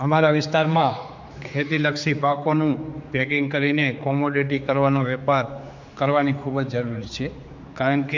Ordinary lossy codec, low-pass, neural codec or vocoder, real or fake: MP3, 64 kbps; 7.2 kHz; codec, 16 kHz, 4 kbps, X-Codec, HuBERT features, trained on general audio; fake